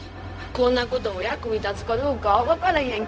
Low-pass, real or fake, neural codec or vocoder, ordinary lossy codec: none; fake; codec, 16 kHz, 0.4 kbps, LongCat-Audio-Codec; none